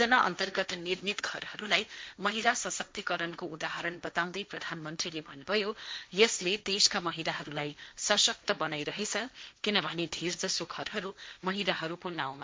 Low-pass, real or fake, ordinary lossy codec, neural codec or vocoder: none; fake; none; codec, 16 kHz, 1.1 kbps, Voila-Tokenizer